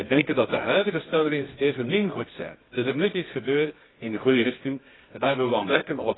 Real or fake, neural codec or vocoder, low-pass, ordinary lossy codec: fake; codec, 24 kHz, 0.9 kbps, WavTokenizer, medium music audio release; 7.2 kHz; AAC, 16 kbps